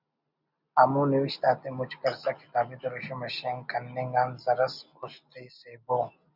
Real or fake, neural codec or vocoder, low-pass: real; none; 5.4 kHz